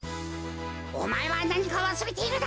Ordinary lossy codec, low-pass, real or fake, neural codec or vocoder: none; none; real; none